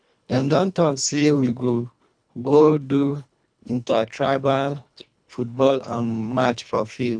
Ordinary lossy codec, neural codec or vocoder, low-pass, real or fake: none; codec, 24 kHz, 1.5 kbps, HILCodec; 9.9 kHz; fake